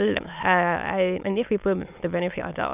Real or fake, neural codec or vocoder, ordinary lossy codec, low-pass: fake; autoencoder, 22.05 kHz, a latent of 192 numbers a frame, VITS, trained on many speakers; none; 3.6 kHz